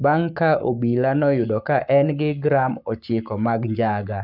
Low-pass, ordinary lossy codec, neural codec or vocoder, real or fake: 5.4 kHz; none; codec, 44.1 kHz, 7.8 kbps, Pupu-Codec; fake